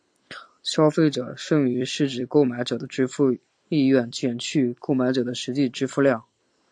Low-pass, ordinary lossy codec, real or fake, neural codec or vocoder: 9.9 kHz; AAC, 64 kbps; fake; vocoder, 44.1 kHz, 128 mel bands every 256 samples, BigVGAN v2